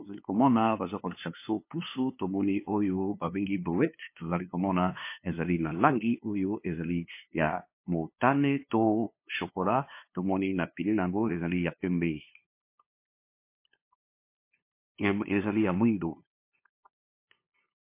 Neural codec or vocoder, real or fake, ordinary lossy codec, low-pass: codec, 16 kHz, 2 kbps, FunCodec, trained on LibriTTS, 25 frames a second; fake; MP3, 24 kbps; 3.6 kHz